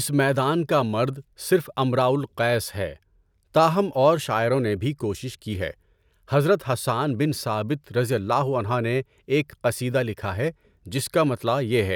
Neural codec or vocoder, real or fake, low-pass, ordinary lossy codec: none; real; none; none